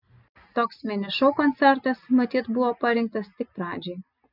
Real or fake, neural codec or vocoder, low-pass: real; none; 5.4 kHz